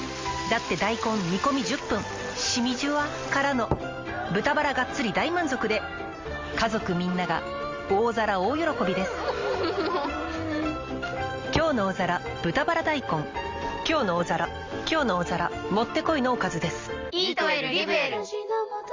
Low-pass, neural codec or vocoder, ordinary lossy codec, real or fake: 7.2 kHz; none; Opus, 32 kbps; real